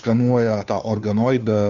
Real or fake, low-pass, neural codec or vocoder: fake; 7.2 kHz; codec, 16 kHz, 2 kbps, FunCodec, trained on Chinese and English, 25 frames a second